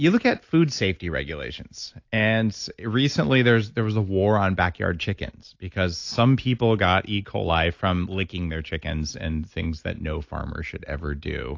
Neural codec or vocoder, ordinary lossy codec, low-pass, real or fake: none; AAC, 48 kbps; 7.2 kHz; real